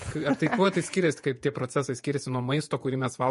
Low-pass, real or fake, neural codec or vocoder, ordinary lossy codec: 14.4 kHz; fake; vocoder, 48 kHz, 128 mel bands, Vocos; MP3, 48 kbps